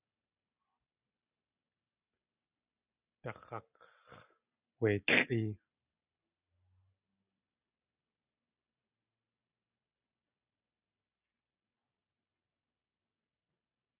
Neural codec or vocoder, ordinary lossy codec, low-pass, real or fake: none; Opus, 64 kbps; 3.6 kHz; real